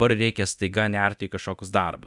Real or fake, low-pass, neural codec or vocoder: fake; 10.8 kHz; codec, 24 kHz, 0.9 kbps, DualCodec